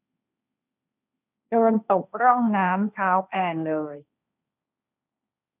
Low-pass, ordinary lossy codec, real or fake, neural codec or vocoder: 3.6 kHz; none; fake; codec, 16 kHz, 1.1 kbps, Voila-Tokenizer